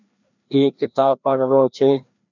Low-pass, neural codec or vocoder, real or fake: 7.2 kHz; codec, 16 kHz, 1 kbps, FreqCodec, larger model; fake